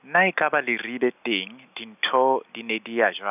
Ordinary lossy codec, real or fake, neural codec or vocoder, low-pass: none; real; none; 3.6 kHz